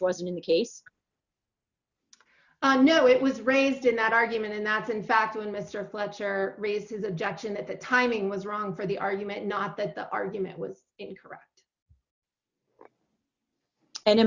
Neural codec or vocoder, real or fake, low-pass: none; real; 7.2 kHz